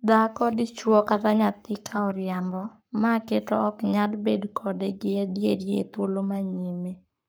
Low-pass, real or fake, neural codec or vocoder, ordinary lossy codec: none; fake; codec, 44.1 kHz, 7.8 kbps, Pupu-Codec; none